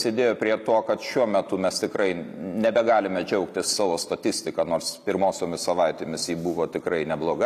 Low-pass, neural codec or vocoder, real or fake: 14.4 kHz; none; real